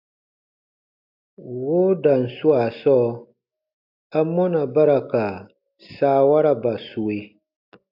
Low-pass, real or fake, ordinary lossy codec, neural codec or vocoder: 5.4 kHz; real; AAC, 48 kbps; none